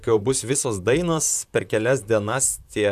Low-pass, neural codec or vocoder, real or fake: 14.4 kHz; vocoder, 44.1 kHz, 128 mel bands, Pupu-Vocoder; fake